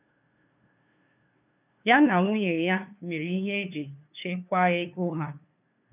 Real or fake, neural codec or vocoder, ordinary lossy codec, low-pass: fake; codec, 16 kHz, 2 kbps, FunCodec, trained on LibriTTS, 25 frames a second; none; 3.6 kHz